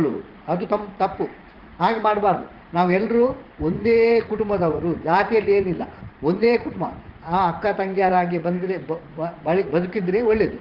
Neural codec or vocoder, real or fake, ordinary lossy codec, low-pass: vocoder, 44.1 kHz, 80 mel bands, Vocos; fake; Opus, 16 kbps; 5.4 kHz